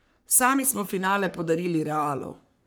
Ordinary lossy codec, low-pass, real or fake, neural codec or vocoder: none; none; fake; codec, 44.1 kHz, 3.4 kbps, Pupu-Codec